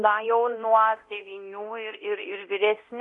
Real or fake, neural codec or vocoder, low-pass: fake; codec, 24 kHz, 0.9 kbps, DualCodec; 10.8 kHz